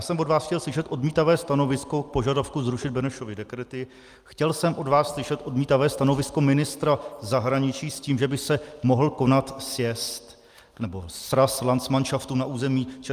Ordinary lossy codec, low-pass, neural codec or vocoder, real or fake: Opus, 32 kbps; 14.4 kHz; none; real